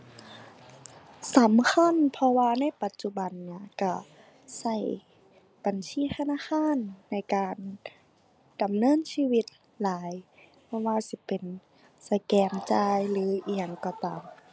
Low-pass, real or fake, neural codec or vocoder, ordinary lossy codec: none; real; none; none